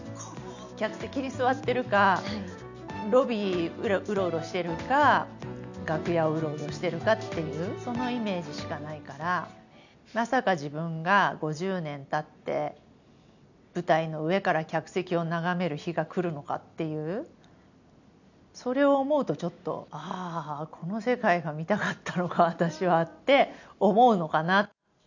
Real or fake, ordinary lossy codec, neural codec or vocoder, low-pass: real; none; none; 7.2 kHz